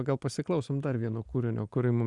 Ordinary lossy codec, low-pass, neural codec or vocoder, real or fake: Opus, 64 kbps; 10.8 kHz; none; real